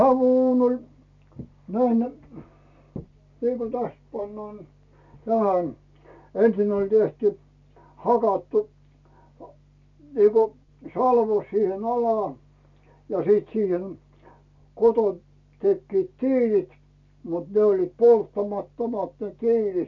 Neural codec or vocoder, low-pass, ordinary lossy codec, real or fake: none; 7.2 kHz; none; real